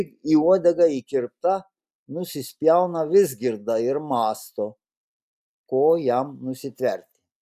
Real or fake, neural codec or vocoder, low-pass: real; none; 14.4 kHz